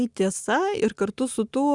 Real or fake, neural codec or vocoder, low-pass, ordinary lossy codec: real; none; 10.8 kHz; Opus, 64 kbps